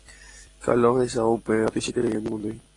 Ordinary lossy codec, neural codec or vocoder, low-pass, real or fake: AAC, 32 kbps; none; 10.8 kHz; real